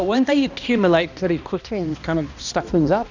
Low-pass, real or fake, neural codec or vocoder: 7.2 kHz; fake; codec, 16 kHz, 1 kbps, X-Codec, HuBERT features, trained on balanced general audio